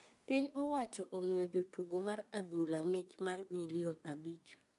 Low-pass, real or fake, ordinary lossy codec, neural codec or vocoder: 10.8 kHz; fake; none; codec, 24 kHz, 1 kbps, SNAC